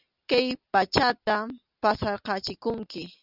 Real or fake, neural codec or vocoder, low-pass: real; none; 5.4 kHz